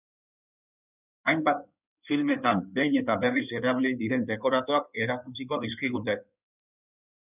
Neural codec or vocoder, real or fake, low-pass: codec, 16 kHz in and 24 kHz out, 2.2 kbps, FireRedTTS-2 codec; fake; 3.6 kHz